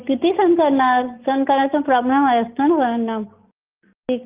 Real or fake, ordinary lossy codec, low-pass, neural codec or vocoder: real; Opus, 24 kbps; 3.6 kHz; none